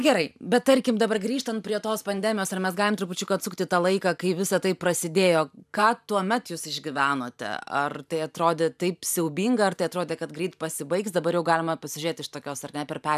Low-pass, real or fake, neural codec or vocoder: 14.4 kHz; fake; vocoder, 44.1 kHz, 128 mel bands every 512 samples, BigVGAN v2